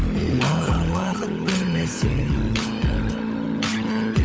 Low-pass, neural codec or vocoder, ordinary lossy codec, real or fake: none; codec, 16 kHz, 16 kbps, FunCodec, trained on LibriTTS, 50 frames a second; none; fake